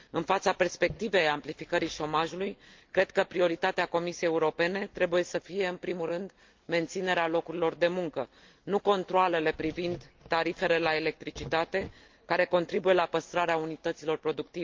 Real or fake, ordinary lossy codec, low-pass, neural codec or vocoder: real; Opus, 32 kbps; 7.2 kHz; none